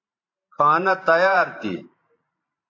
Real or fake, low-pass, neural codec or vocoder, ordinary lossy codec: fake; 7.2 kHz; vocoder, 44.1 kHz, 128 mel bands every 512 samples, BigVGAN v2; AAC, 48 kbps